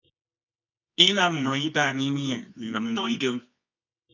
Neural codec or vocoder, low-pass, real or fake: codec, 24 kHz, 0.9 kbps, WavTokenizer, medium music audio release; 7.2 kHz; fake